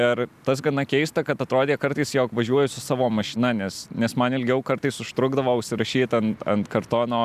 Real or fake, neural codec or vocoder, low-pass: real; none; 14.4 kHz